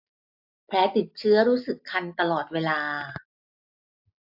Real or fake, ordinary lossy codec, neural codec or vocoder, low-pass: real; AAC, 48 kbps; none; 5.4 kHz